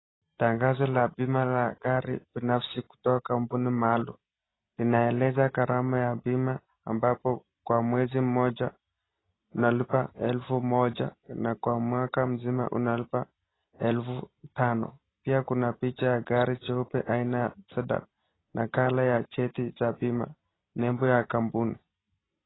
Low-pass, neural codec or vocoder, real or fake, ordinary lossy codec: 7.2 kHz; none; real; AAC, 16 kbps